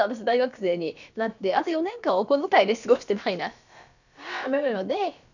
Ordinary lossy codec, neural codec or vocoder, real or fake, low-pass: none; codec, 16 kHz, about 1 kbps, DyCAST, with the encoder's durations; fake; 7.2 kHz